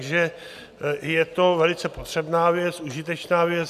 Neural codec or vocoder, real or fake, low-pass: none; real; 14.4 kHz